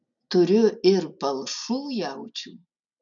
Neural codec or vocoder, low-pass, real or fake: none; 7.2 kHz; real